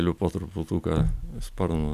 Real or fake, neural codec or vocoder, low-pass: real; none; 14.4 kHz